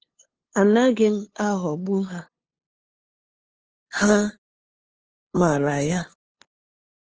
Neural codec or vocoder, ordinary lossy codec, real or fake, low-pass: codec, 16 kHz, 2 kbps, FunCodec, trained on LibriTTS, 25 frames a second; Opus, 16 kbps; fake; 7.2 kHz